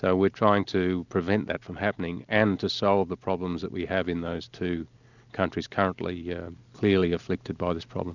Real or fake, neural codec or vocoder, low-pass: real; none; 7.2 kHz